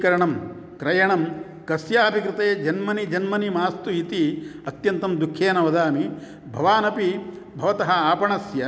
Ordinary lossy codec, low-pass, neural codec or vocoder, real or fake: none; none; none; real